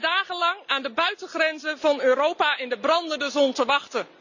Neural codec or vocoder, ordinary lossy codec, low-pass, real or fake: none; none; 7.2 kHz; real